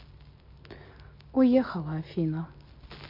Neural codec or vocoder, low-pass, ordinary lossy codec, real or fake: none; 5.4 kHz; MP3, 32 kbps; real